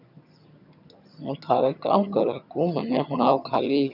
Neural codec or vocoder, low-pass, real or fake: vocoder, 22.05 kHz, 80 mel bands, HiFi-GAN; 5.4 kHz; fake